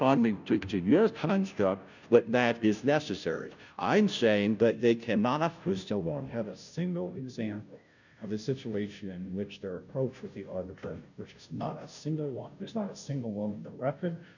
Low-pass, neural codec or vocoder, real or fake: 7.2 kHz; codec, 16 kHz, 0.5 kbps, FunCodec, trained on Chinese and English, 25 frames a second; fake